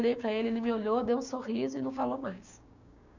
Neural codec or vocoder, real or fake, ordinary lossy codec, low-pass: codec, 16 kHz, 6 kbps, DAC; fake; none; 7.2 kHz